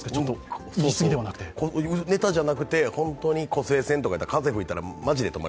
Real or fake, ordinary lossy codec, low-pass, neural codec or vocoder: real; none; none; none